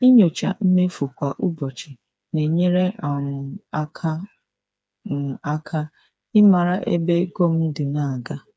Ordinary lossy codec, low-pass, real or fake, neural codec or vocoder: none; none; fake; codec, 16 kHz, 4 kbps, FreqCodec, smaller model